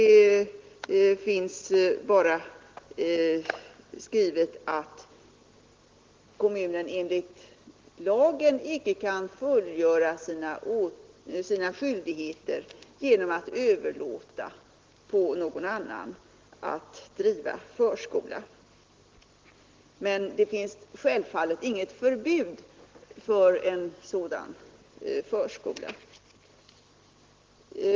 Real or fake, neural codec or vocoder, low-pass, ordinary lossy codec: real; none; 7.2 kHz; Opus, 16 kbps